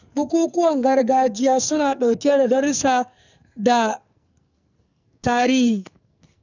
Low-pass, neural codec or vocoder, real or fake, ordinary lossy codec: 7.2 kHz; codec, 44.1 kHz, 2.6 kbps, SNAC; fake; none